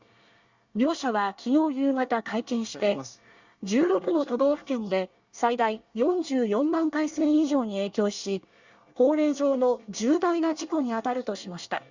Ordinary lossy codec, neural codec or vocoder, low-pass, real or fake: Opus, 64 kbps; codec, 24 kHz, 1 kbps, SNAC; 7.2 kHz; fake